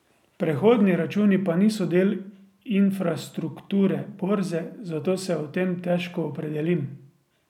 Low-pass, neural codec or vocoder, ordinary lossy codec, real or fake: 19.8 kHz; none; none; real